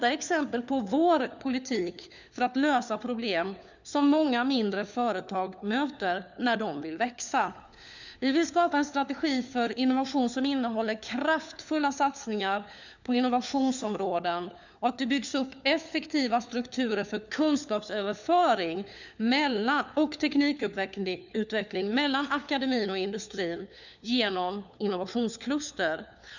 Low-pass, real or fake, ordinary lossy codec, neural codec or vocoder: 7.2 kHz; fake; none; codec, 16 kHz, 4 kbps, FunCodec, trained on LibriTTS, 50 frames a second